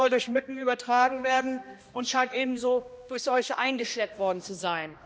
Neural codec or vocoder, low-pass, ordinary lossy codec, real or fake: codec, 16 kHz, 1 kbps, X-Codec, HuBERT features, trained on balanced general audio; none; none; fake